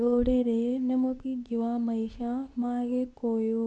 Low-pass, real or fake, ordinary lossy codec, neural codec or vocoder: none; fake; none; codec, 24 kHz, 0.9 kbps, WavTokenizer, medium speech release version 2